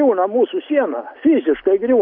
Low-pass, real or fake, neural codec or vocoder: 5.4 kHz; real; none